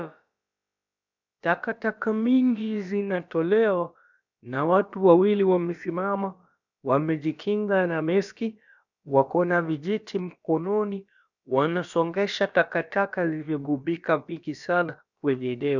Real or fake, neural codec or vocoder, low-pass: fake; codec, 16 kHz, about 1 kbps, DyCAST, with the encoder's durations; 7.2 kHz